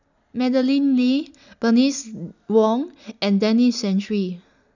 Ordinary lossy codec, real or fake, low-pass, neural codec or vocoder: none; real; 7.2 kHz; none